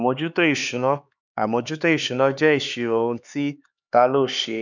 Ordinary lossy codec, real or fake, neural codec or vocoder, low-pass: none; fake; codec, 16 kHz, 4 kbps, X-Codec, HuBERT features, trained on LibriSpeech; 7.2 kHz